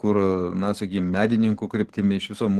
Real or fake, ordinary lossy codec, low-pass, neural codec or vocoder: fake; Opus, 16 kbps; 14.4 kHz; codec, 44.1 kHz, 7.8 kbps, DAC